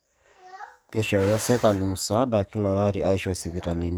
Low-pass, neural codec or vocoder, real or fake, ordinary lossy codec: none; codec, 44.1 kHz, 2.6 kbps, SNAC; fake; none